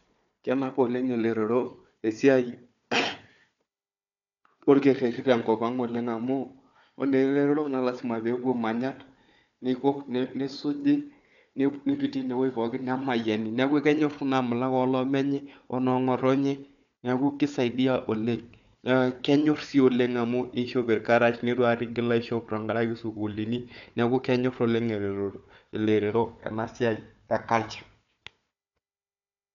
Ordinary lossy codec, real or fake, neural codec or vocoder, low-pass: none; fake; codec, 16 kHz, 4 kbps, FunCodec, trained on Chinese and English, 50 frames a second; 7.2 kHz